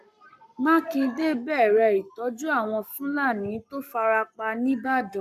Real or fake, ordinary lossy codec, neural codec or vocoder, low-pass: fake; none; codec, 44.1 kHz, 7.8 kbps, Pupu-Codec; 14.4 kHz